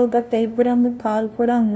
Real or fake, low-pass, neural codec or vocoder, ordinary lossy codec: fake; none; codec, 16 kHz, 0.5 kbps, FunCodec, trained on LibriTTS, 25 frames a second; none